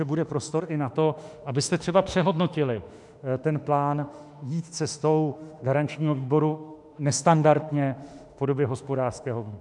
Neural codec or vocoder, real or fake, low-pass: autoencoder, 48 kHz, 32 numbers a frame, DAC-VAE, trained on Japanese speech; fake; 10.8 kHz